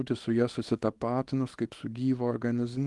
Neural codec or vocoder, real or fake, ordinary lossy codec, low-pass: codec, 24 kHz, 0.9 kbps, WavTokenizer, small release; fake; Opus, 24 kbps; 10.8 kHz